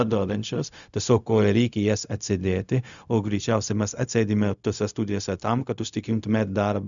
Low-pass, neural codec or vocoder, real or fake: 7.2 kHz; codec, 16 kHz, 0.4 kbps, LongCat-Audio-Codec; fake